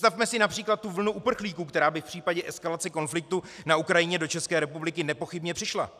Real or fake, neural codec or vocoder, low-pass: real; none; 14.4 kHz